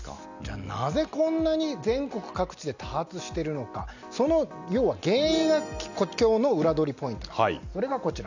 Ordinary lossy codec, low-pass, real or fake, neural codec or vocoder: none; 7.2 kHz; real; none